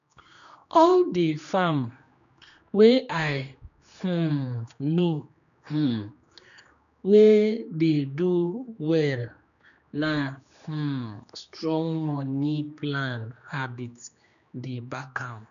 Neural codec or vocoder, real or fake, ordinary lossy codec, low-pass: codec, 16 kHz, 2 kbps, X-Codec, HuBERT features, trained on general audio; fake; none; 7.2 kHz